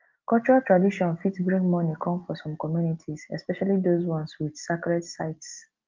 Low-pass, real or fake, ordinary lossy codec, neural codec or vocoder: 7.2 kHz; real; Opus, 32 kbps; none